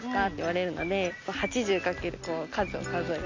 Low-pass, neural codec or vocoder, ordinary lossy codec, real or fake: 7.2 kHz; none; MP3, 48 kbps; real